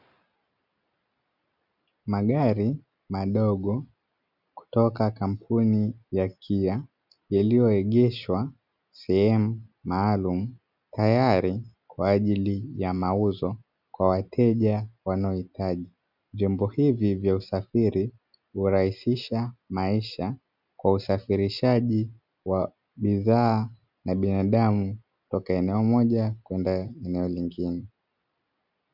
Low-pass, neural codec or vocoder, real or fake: 5.4 kHz; none; real